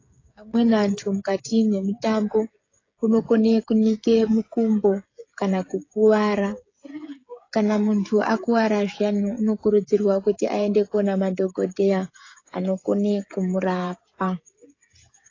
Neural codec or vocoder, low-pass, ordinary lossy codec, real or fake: codec, 16 kHz, 16 kbps, FreqCodec, smaller model; 7.2 kHz; AAC, 32 kbps; fake